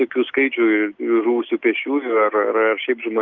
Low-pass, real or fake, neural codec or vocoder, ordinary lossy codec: 7.2 kHz; real; none; Opus, 32 kbps